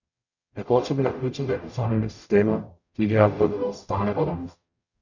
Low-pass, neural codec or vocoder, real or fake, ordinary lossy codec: 7.2 kHz; codec, 44.1 kHz, 0.9 kbps, DAC; fake; none